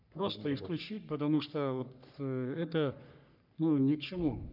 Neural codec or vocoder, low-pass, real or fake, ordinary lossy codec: codec, 44.1 kHz, 3.4 kbps, Pupu-Codec; 5.4 kHz; fake; none